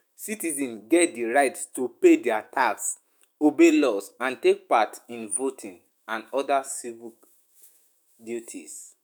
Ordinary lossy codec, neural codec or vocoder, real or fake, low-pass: none; autoencoder, 48 kHz, 128 numbers a frame, DAC-VAE, trained on Japanese speech; fake; none